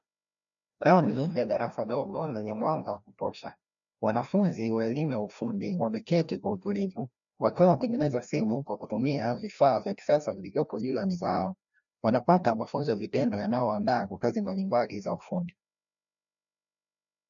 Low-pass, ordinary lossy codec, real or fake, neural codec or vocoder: 7.2 kHz; MP3, 96 kbps; fake; codec, 16 kHz, 1 kbps, FreqCodec, larger model